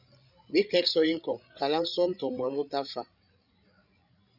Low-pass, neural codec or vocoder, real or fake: 5.4 kHz; codec, 16 kHz, 16 kbps, FreqCodec, larger model; fake